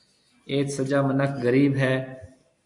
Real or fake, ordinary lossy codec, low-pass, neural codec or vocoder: real; AAC, 64 kbps; 10.8 kHz; none